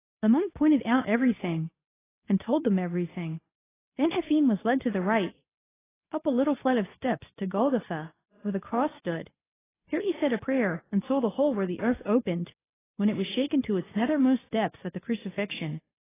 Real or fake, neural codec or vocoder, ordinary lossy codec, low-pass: fake; codec, 24 kHz, 0.9 kbps, WavTokenizer, medium speech release version 2; AAC, 16 kbps; 3.6 kHz